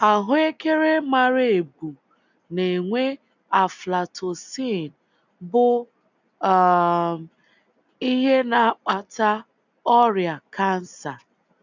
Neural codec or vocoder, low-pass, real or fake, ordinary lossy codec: none; 7.2 kHz; real; none